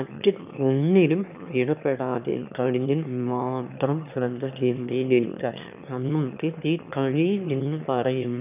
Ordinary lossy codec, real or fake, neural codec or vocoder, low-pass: none; fake; autoencoder, 22.05 kHz, a latent of 192 numbers a frame, VITS, trained on one speaker; 3.6 kHz